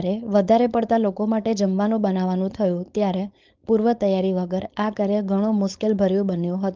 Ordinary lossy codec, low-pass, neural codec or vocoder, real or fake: Opus, 32 kbps; 7.2 kHz; codec, 16 kHz, 4.8 kbps, FACodec; fake